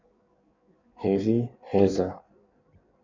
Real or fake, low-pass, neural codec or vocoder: fake; 7.2 kHz; codec, 16 kHz in and 24 kHz out, 1.1 kbps, FireRedTTS-2 codec